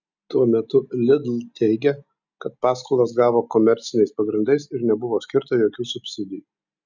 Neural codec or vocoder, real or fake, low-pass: none; real; 7.2 kHz